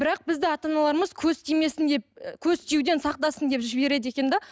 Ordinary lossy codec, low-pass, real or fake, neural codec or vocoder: none; none; real; none